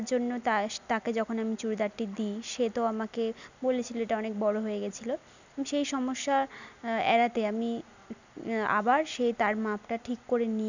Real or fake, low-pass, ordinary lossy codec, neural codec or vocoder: real; 7.2 kHz; none; none